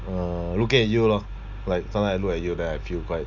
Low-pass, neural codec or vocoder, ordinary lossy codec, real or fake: 7.2 kHz; none; Opus, 64 kbps; real